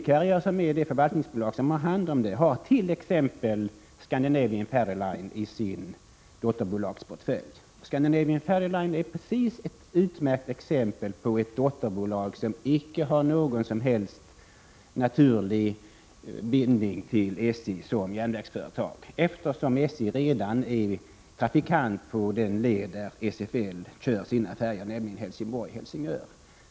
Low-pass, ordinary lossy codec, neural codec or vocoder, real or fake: none; none; none; real